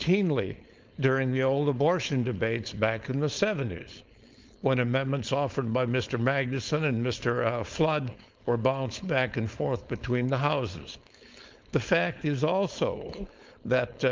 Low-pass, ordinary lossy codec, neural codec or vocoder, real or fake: 7.2 kHz; Opus, 32 kbps; codec, 16 kHz, 4.8 kbps, FACodec; fake